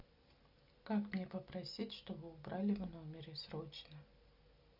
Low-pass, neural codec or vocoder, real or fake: 5.4 kHz; none; real